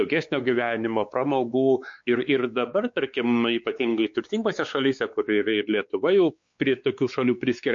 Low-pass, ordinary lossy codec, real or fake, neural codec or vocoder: 7.2 kHz; MP3, 48 kbps; fake; codec, 16 kHz, 4 kbps, X-Codec, WavLM features, trained on Multilingual LibriSpeech